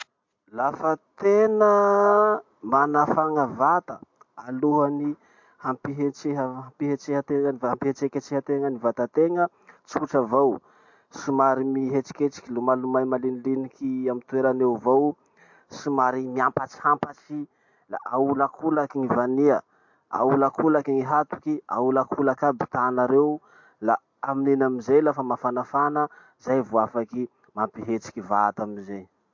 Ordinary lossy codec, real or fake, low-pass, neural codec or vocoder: MP3, 48 kbps; real; 7.2 kHz; none